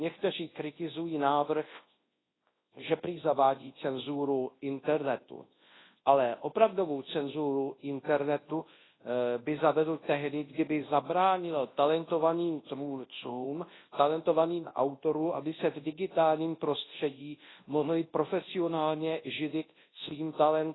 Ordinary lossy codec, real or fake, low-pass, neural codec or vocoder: AAC, 16 kbps; fake; 7.2 kHz; codec, 24 kHz, 0.9 kbps, WavTokenizer, large speech release